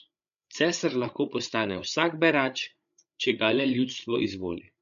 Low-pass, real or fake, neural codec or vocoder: 7.2 kHz; fake; codec, 16 kHz, 8 kbps, FreqCodec, larger model